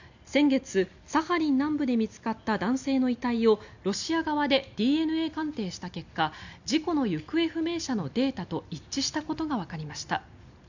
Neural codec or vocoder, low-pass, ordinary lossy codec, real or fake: none; 7.2 kHz; none; real